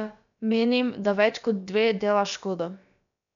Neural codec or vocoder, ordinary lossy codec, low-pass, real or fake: codec, 16 kHz, about 1 kbps, DyCAST, with the encoder's durations; none; 7.2 kHz; fake